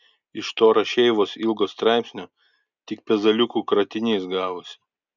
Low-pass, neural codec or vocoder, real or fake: 7.2 kHz; none; real